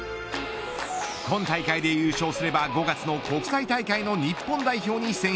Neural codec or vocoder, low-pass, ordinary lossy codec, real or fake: none; none; none; real